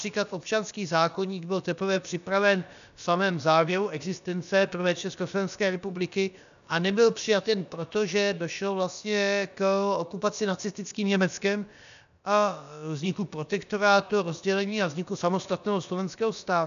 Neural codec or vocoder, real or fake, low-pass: codec, 16 kHz, about 1 kbps, DyCAST, with the encoder's durations; fake; 7.2 kHz